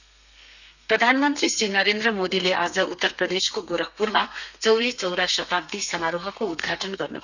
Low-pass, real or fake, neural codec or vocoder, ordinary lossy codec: 7.2 kHz; fake; codec, 32 kHz, 1.9 kbps, SNAC; none